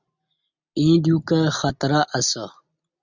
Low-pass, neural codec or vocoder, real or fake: 7.2 kHz; none; real